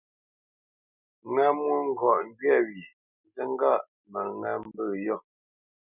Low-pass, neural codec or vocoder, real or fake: 3.6 kHz; none; real